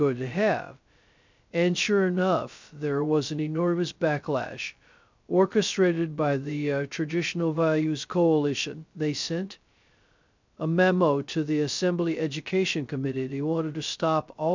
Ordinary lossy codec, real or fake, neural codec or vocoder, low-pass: MP3, 64 kbps; fake; codec, 16 kHz, 0.2 kbps, FocalCodec; 7.2 kHz